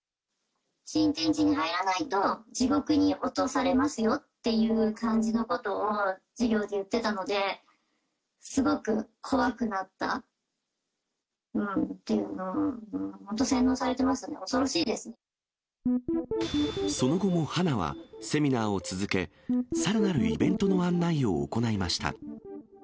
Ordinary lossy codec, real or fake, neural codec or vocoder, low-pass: none; real; none; none